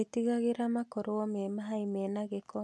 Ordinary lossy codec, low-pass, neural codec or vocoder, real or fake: none; none; none; real